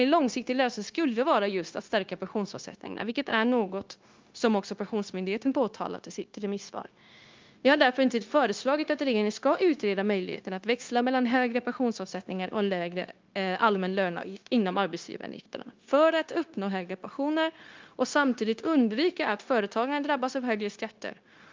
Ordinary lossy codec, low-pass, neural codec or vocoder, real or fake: Opus, 32 kbps; 7.2 kHz; codec, 16 kHz, 0.9 kbps, LongCat-Audio-Codec; fake